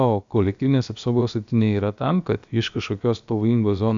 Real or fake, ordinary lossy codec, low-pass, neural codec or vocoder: fake; MP3, 64 kbps; 7.2 kHz; codec, 16 kHz, about 1 kbps, DyCAST, with the encoder's durations